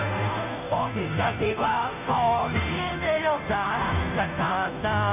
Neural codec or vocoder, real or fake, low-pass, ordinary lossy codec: codec, 16 kHz, 0.5 kbps, FunCodec, trained on Chinese and English, 25 frames a second; fake; 3.6 kHz; none